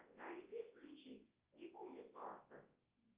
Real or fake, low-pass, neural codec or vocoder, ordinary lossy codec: fake; 3.6 kHz; codec, 24 kHz, 0.9 kbps, WavTokenizer, large speech release; Opus, 32 kbps